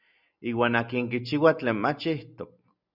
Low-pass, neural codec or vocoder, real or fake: 5.4 kHz; none; real